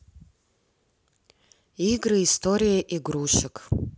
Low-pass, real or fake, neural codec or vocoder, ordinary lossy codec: none; real; none; none